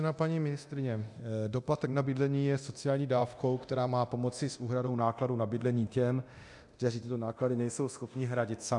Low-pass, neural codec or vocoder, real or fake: 10.8 kHz; codec, 24 kHz, 0.9 kbps, DualCodec; fake